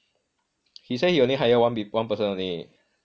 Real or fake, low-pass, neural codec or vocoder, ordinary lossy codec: real; none; none; none